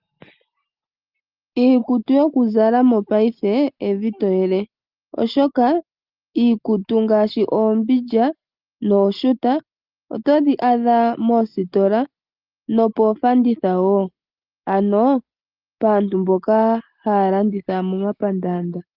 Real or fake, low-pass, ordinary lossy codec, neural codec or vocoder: real; 5.4 kHz; Opus, 32 kbps; none